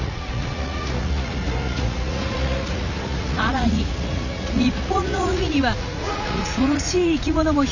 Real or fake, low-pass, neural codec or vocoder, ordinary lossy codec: fake; 7.2 kHz; vocoder, 44.1 kHz, 80 mel bands, Vocos; none